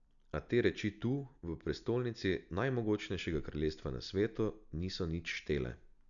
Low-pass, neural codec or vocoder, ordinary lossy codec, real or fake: 7.2 kHz; none; none; real